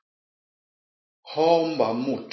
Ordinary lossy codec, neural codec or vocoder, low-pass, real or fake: MP3, 24 kbps; none; 7.2 kHz; real